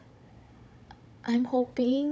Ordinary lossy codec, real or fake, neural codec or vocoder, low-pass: none; fake; codec, 16 kHz, 16 kbps, FunCodec, trained on Chinese and English, 50 frames a second; none